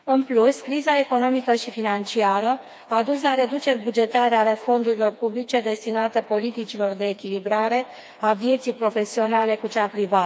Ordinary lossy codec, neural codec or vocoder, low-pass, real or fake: none; codec, 16 kHz, 2 kbps, FreqCodec, smaller model; none; fake